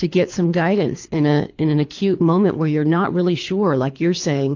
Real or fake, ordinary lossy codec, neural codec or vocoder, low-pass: fake; AAC, 48 kbps; codec, 24 kHz, 3 kbps, HILCodec; 7.2 kHz